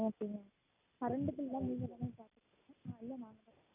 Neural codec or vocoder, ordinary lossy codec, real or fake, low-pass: none; none; real; 3.6 kHz